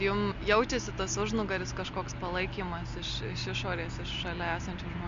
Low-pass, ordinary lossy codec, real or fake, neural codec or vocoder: 7.2 kHz; MP3, 48 kbps; real; none